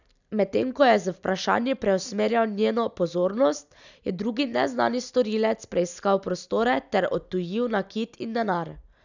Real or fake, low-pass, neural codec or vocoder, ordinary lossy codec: real; 7.2 kHz; none; none